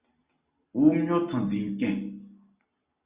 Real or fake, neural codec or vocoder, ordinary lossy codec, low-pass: real; none; Opus, 64 kbps; 3.6 kHz